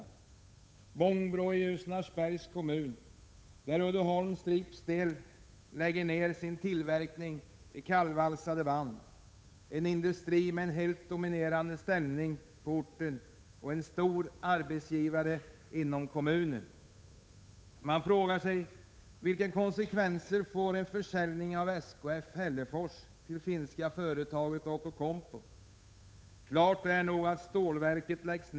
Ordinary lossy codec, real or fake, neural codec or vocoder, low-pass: none; fake; codec, 16 kHz, 8 kbps, FunCodec, trained on Chinese and English, 25 frames a second; none